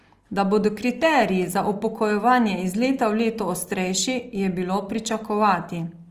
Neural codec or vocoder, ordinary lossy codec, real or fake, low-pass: none; Opus, 24 kbps; real; 14.4 kHz